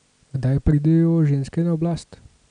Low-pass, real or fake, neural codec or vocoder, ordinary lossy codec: 9.9 kHz; real; none; none